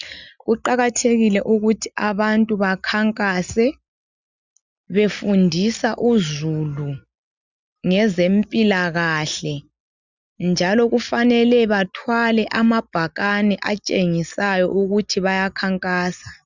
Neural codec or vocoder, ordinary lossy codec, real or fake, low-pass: none; Opus, 64 kbps; real; 7.2 kHz